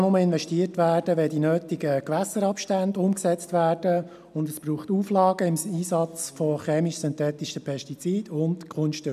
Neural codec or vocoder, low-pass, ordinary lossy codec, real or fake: none; 14.4 kHz; none; real